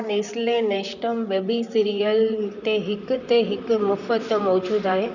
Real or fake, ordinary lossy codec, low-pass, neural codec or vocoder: fake; none; 7.2 kHz; vocoder, 44.1 kHz, 128 mel bands, Pupu-Vocoder